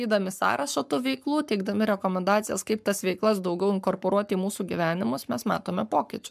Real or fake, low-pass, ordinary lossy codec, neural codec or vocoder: fake; 14.4 kHz; MP3, 96 kbps; codec, 44.1 kHz, 7.8 kbps, Pupu-Codec